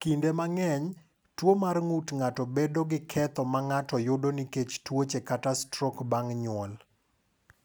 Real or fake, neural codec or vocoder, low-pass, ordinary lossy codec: real; none; none; none